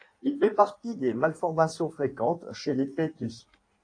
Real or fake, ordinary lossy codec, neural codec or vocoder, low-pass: fake; AAC, 48 kbps; codec, 16 kHz in and 24 kHz out, 1.1 kbps, FireRedTTS-2 codec; 9.9 kHz